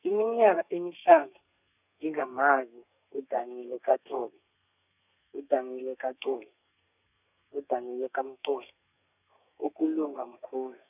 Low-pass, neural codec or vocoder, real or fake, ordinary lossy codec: 3.6 kHz; codec, 32 kHz, 1.9 kbps, SNAC; fake; none